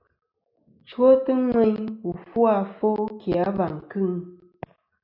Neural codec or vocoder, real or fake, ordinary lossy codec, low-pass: none; real; AAC, 48 kbps; 5.4 kHz